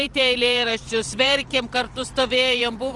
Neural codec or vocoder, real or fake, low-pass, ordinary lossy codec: vocoder, 44.1 kHz, 128 mel bands every 512 samples, BigVGAN v2; fake; 10.8 kHz; Opus, 24 kbps